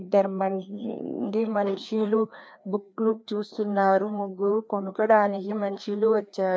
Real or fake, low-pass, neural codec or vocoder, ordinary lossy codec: fake; none; codec, 16 kHz, 2 kbps, FreqCodec, larger model; none